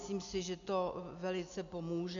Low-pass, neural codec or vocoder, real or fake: 7.2 kHz; none; real